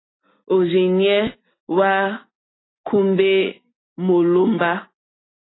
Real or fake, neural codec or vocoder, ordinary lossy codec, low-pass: real; none; AAC, 16 kbps; 7.2 kHz